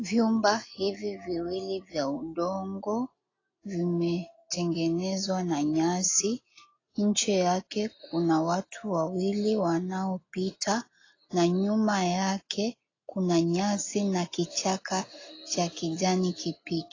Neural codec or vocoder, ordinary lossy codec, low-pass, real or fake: none; AAC, 32 kbps; 7.2 kHz; real